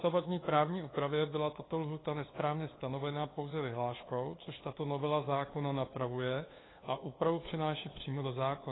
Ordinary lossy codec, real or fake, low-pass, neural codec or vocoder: AAC, 16 kbps; fake; 7.2 kHz; codec, 16 kHz, 2 kbps, FunCodec, trained on LibriTTS, 25 frames a second